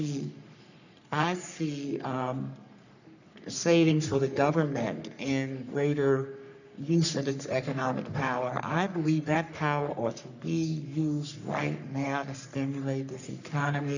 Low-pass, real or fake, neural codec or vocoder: 7.2 kHz; fake; codec, 44.1 kHz, 3.4 kbps, Pupu-Codec